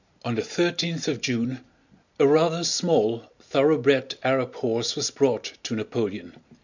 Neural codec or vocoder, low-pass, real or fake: none; 7.2 kHz; real